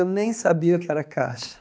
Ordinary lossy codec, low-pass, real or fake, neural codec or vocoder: none; none; fake; codec, 16 kHz, 2 kbps, X-Codec, HuBERT features, trained on balanced general audio